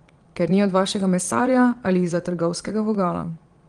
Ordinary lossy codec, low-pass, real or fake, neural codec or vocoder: Opus, 24 kbps; 9.9 kHz; fake; vocoder, 22.05 kHz, 80 mel bands, Vocos